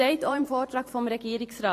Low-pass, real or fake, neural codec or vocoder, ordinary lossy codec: 14.4 kHz; fake; vocoder, 44.1 kHz, 128 mel bands every 512 samples, BigVGAN v2; AAC, 48 kbps